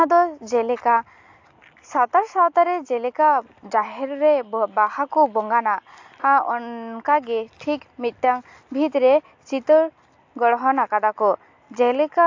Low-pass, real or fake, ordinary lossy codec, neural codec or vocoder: 7.2 kHz; real; AAC, 48 kbps; none